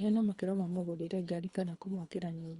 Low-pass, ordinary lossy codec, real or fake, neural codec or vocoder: 10.8 kHz; Opus, 64 kbps; fake; codec, 24 kHz, 3 kbps, HILCodec